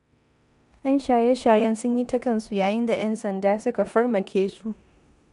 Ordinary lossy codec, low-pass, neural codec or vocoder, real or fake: none; 10.8 kHz; codec, 16 kHz in and 24 kHz out, 0.9 kbps, LongCat-Audio-Codec, four codebook decoder; fake